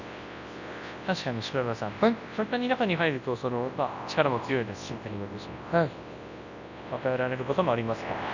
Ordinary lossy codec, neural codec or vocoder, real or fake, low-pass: none; codec, 24 kHz, 0.9 kbps, WavTokenizer, large speech release; fake; 7.2 kHz